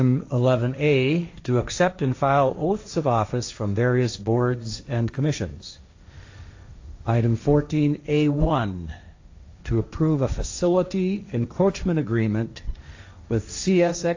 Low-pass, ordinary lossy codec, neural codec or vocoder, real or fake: 7.2 kHz; AAC, 48 kbps; codec, 16 kHz, 1.1 kbps, Voila-Tokenizer; fake